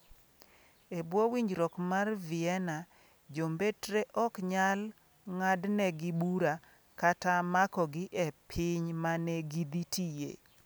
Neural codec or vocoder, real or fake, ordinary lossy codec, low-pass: none; real; none; none